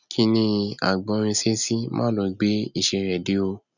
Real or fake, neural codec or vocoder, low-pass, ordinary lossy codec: real; none; 7.2 kHz; none